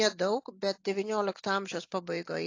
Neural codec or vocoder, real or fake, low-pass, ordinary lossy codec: none; real; 7.2 kHz; AAC, 48 kbps